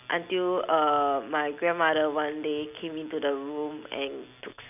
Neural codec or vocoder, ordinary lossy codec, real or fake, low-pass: none; none; real; 3.6 kHz